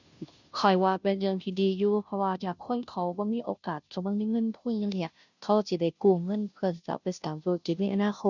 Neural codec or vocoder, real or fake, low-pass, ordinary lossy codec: codec, 16 kHz, 0.5 kbps, FunCodec, trained on Chinese and English, 25 frames a second; fake; 7.2 kHz; none